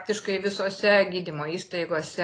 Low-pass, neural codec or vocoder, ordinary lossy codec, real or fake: 9.9 kHz; none; AAC, 32 kbps; real